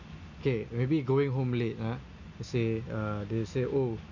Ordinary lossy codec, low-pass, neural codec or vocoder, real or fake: none; 7.2 kHz; none; real